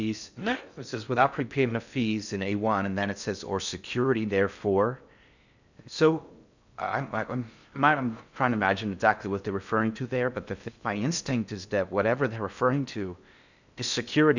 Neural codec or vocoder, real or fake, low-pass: codec, 16 kHz in and 24 kHz out, 0.6 kbps, FocalCodec, streaming, 2048 codes; fake; 7.2 kHz